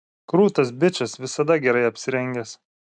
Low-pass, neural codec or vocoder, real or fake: 9.9 kHz; none; real